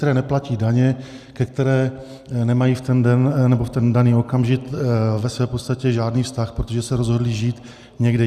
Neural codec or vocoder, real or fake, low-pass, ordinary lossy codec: none; real; 14.4 kHz; Opus, 64 kbps